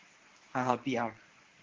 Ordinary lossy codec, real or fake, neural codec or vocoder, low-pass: Opus, 16 kbps; fake; codec, 24 kHz, 0.9 kbps, WavTokenizer, medium speech release version 1; 7.2 kHz